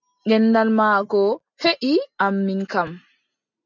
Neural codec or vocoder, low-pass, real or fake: none; 7.2 kHz; real